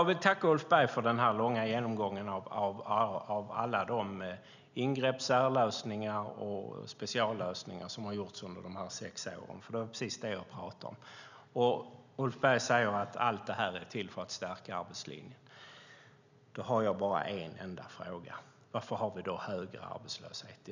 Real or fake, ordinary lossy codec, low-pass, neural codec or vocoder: real; none; 7.2 kHz; none